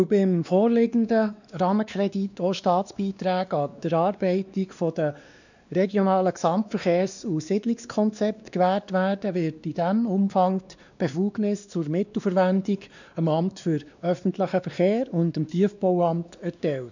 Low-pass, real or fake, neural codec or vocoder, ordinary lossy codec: 7.2 kHz; fake; codec, 16 kHz, 2 kbps, X-Codec, WavLM features, trained on Multilingual LibriSpeech; none